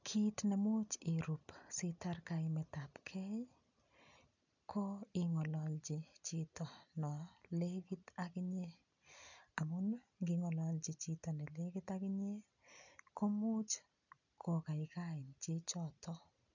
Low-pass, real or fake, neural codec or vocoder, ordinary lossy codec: 7.2 kHz; real; none; none